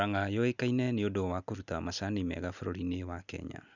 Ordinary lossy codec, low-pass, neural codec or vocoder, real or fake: none; 7.2 kHz; none; real